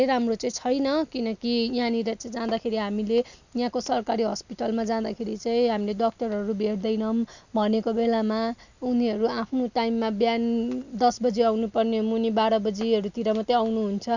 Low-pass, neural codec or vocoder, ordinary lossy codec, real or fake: 7.2 kHz; none; none; real